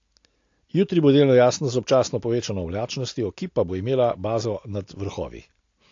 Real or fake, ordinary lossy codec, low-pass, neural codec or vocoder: real; AAC, 48 kbps; 7.2 kHz; none